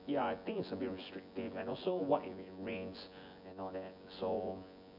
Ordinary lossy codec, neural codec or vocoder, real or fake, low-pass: none; vocoder, 24 kHz, 100 mel bands, Vocos; fake; 5.4 kHz